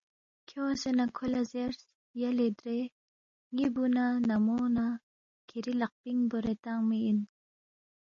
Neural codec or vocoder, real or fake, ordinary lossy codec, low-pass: none; real; MP3, 32 kbps; 7.2 kHz